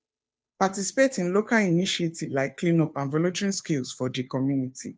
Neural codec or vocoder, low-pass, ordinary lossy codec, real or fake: codec, 16 kHz, 2 kbps, FunCodec, trained on Chinese and English, 25 frames a second; none; none; fake